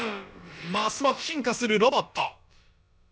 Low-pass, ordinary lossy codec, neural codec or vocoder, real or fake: none; none; codec, 16 kHz, about 1 kbps, DyCAST, with the encoder's durations; fake